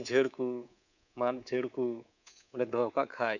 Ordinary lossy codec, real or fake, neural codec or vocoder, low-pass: none; fake; codec, 24 kHz, 3.1 kbps, DualCodec; 7.2 kHz